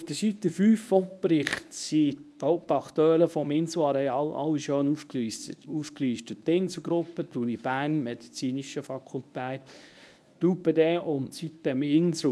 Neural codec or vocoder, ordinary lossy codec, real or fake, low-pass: codec, 24 kHz, 0.9 kbps, WavTokenizer, medium speech release version 1; none; fake; none